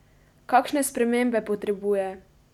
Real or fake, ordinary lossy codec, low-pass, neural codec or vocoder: real; none; 19.8 kHz; none